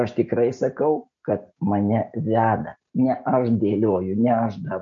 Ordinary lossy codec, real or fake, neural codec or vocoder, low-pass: MP3, 48 kbps; real; none; 7.2 kHz